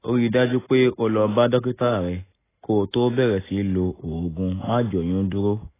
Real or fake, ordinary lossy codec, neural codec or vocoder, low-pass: real; AAC, 16 kbps; none; 3.6 kHz